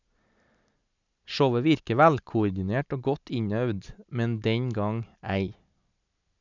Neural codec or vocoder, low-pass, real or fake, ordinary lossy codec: none; 7.2 kHz; real; none